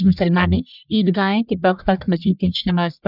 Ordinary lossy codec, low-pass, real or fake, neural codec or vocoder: none; 5.4 kHz; fake; codec, 44.1 kHz, 1.7 kbps, Pupu-Codec